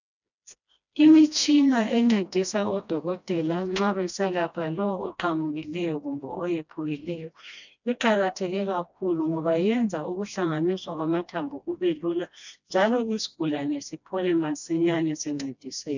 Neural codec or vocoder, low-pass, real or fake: codec, 16 kHz, 1 kbps, FreqCodec, smaller model; 7.2 kHz; fake